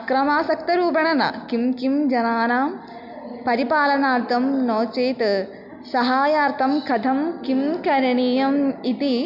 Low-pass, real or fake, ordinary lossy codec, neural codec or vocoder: 5.4 kHz; real; none; none